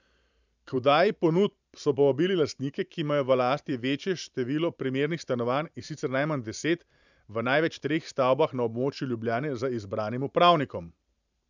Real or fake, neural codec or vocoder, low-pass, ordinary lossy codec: fake; vocoder, 44.1 kHz, 128 mel bands every 512 samples, BigVGAN v2; 7.2 kHz; none